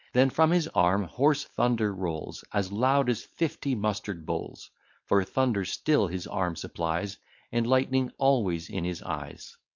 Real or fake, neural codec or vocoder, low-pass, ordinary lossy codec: fake; codec, 16 kHz, 4.8 kbps, FACodec; 7.2 kHz; MP3, 48 kbps